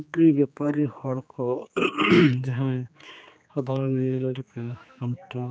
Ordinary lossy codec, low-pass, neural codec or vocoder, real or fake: none; none; codec, 16 kHz, 2 kbps, X-Codec, HuBERT features, trained on general audio; fake